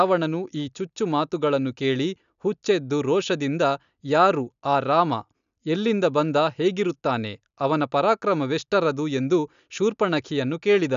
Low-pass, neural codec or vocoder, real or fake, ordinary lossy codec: 7.2 kHz; none; real; none